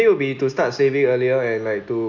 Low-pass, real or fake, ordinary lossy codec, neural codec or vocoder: 7.2 kHz; real; none; none